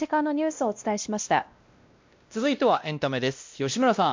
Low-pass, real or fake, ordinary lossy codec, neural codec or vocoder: 7.2 kHz; fake; MP3, 64 kbps; codec, 16 kHz, 1 kbps, X-Codec, WavLM features, trained on Multilingual LibriSpeech